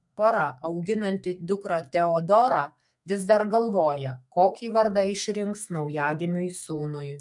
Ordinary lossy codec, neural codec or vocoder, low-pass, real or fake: MP3, 64 kbps; codec, 32 kHz, 1.9 kbps, SNAC; 10.8 kHz; fake